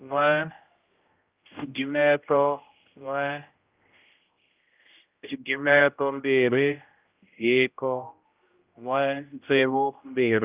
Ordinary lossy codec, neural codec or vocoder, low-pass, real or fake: Opus, 32 kbps; codec, 16 kHz, 0.5 kbps, X-Codec, HuBERT features, trained on general audio; 3.6 kHz; fake